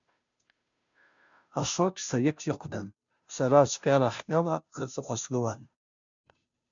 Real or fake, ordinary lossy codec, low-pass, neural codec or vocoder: fake; MP3, 64 kbps; 7.2 kHz; codec, 16 kHz, 0.5 kbps, FunCodec, trained on Chinese and English, 25 frames a second